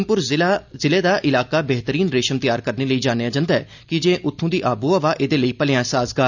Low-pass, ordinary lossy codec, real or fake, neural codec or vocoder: 7.2 kHz; none; real; none